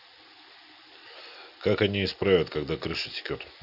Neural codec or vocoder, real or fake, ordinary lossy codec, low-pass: none; real; none; 5.4 kHz